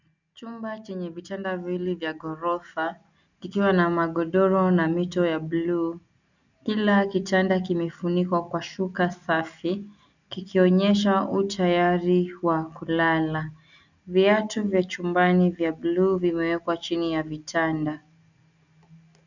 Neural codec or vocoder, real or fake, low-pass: none; real; 7.2 kHz